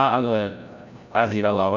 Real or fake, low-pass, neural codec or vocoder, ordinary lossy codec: fake; 7.2 kHz; codec, 16 kHz, 0.5 kbps, FreqCodec, larger model; none